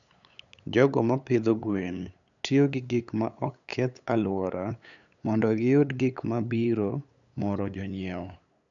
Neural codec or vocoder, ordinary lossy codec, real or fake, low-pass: codec, 16 kHz, 8 kbps, FunCodec, trained on LibriTTS, 25 frames a second; none; fake; 7.2 kHz